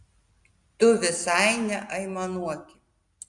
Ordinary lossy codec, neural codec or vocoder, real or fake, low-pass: Opus, 64 kbps; none; real; 10.8 kHz